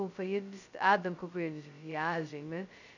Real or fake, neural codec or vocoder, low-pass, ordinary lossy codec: fake; codec, 16 kHz, 0.2 kbps, FocalCodec; 7.2 kHz; none